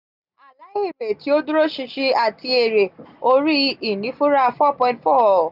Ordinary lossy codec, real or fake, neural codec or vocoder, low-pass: none; real; none; 5.4 kHz